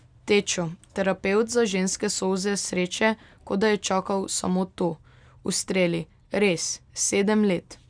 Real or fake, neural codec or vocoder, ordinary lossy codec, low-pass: real; none; none; 9.9 kHz